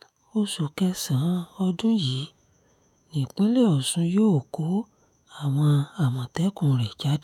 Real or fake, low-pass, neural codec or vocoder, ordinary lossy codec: fake; 19.8 kHz; autoencoder, 48 kHz, 128 numbers a frame, DAC-VAE, trained on Japanese speech; none